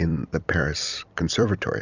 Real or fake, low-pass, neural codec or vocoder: fake; 7.2 kHz; vocoder, 44.1 kHz, 128 mel bands every 256 samples, BigVGAN v2